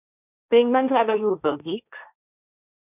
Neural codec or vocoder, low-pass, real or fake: codec, 16 kHz, 1.1 kbps, Voila-Tokenizer; 3.6 kHz; fake